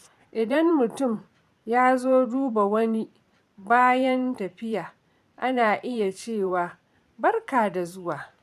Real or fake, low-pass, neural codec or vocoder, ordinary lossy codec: fake; 14.4 kHz; vocoder, 44.1 kHz, 128 mel bands every 512 samples, BigVGAN v2; none